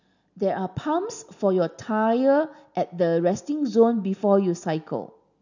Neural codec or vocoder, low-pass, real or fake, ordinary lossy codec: none; 7.2 kHz; real; none